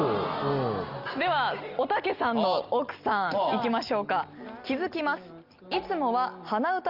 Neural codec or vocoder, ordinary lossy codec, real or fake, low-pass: none; Opus, 24 kbps; real; 5.4 kHz